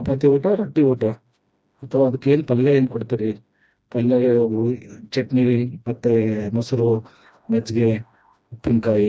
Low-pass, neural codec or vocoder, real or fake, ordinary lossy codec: none; codec, 16 kHz, 1 kbps, FreqCodec, smaller model; fake; none